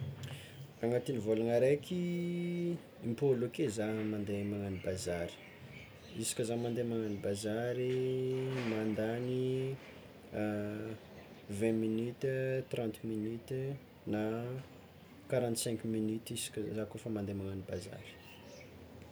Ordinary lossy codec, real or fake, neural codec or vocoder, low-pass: none; real; none; none